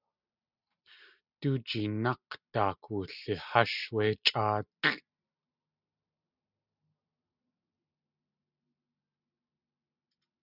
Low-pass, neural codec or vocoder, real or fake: 5.4 kHz; none; real